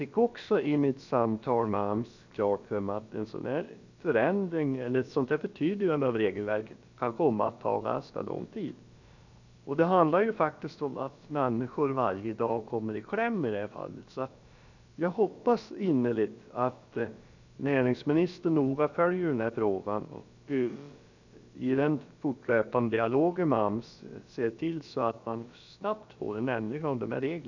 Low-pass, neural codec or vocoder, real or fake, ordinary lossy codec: 7.2 kHz; codec, 16 kHz, about 1 kbps, DyCAST, with the encoder's durations; fake; none